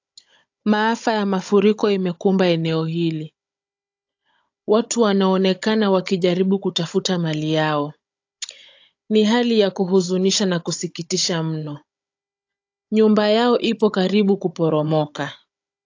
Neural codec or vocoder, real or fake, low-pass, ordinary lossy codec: codec, 16 kHz, 16 kbps, FunCodec, trained on Chinese and English, 50 frames a second; fake; 7.2 kHz; AAC, 48 kbps